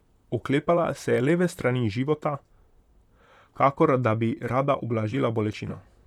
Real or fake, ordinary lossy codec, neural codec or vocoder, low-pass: fake; none; vocoder, 44.1 kHz, 128 mel bands, Pupu-Vocoder; 19.8 kHz